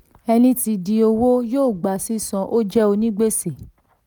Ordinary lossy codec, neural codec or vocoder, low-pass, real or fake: none; none; none; real